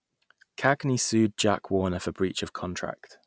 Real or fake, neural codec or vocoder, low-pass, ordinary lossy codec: real; none; none; none